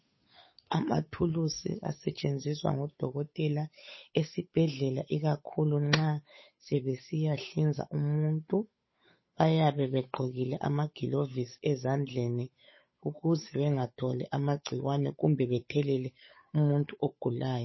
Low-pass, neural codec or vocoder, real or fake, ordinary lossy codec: 7.2 kHz; codec, 16 kHz, 8 kbps, FunCodec, trained on Chinese and English, 25 frames a second; fake; MP3, 24 kbps